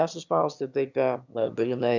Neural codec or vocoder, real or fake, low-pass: autoencoder, 22.05 kHz, a latent of 192 numbers a frame, VITS, trained on one speaker; fake; 7.2 kHz